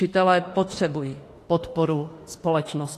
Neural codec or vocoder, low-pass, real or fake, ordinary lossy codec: autoencoder, 48 kHz, 32 numbers a frame, DAC-VAE, trained on Japanese speech; 14.4 kHz; fake; AAC, 48 kbps